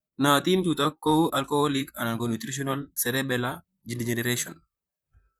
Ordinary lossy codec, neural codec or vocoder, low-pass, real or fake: none; vocoder, 44.1 kHz, 128 mel bands, Pupu-Vocoder; none; fake